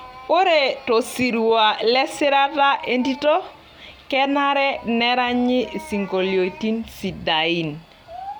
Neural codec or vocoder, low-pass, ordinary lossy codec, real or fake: none; none; none; real